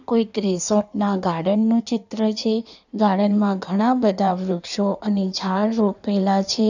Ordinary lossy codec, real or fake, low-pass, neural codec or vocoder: none; fake; 7.2 kHz; codec, 16 kHz in and 24 kHz out, 1.1 kbps, FireRedTTS-2 codec